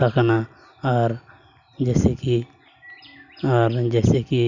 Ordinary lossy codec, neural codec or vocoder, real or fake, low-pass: none; none; real; 7.2 kHz